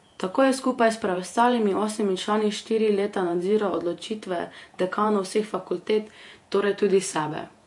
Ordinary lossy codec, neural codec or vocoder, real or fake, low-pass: MP3, 48 kbps; vocoder, 48 kHz, 128 mel bands, Vocos; fake; 10.8 kHz